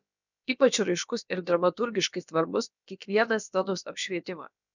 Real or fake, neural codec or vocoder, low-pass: fake; codec, 16 kHz, about 1 kbps, DyCAST, with the encoder's durations; 7.2 kHz